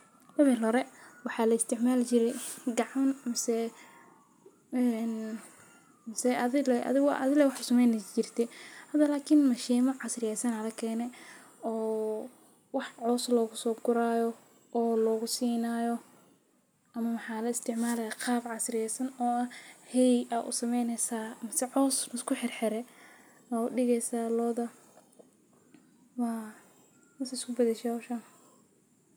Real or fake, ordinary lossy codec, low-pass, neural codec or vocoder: real; none; none; none